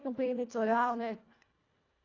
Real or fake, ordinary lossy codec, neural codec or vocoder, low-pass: fake; AAC, 32 kbps; codec, 24 kHz, 1.5 kbps, HILCodec; 7.2 kHz